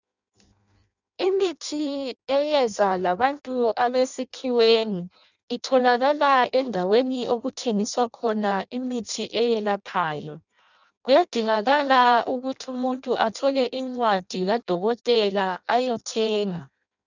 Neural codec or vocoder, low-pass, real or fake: codec, 16 kHz in and 24 kHz out, 0.6 kbps, FireRedTTS-2 codec; 7.2 kHz; fake